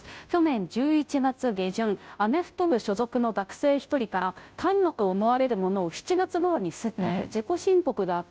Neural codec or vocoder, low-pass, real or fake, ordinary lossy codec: codec, 16 kHz, 0.5 kbps, FunCodec, trained on Chinese and English, 25 frames a second; none; fake; none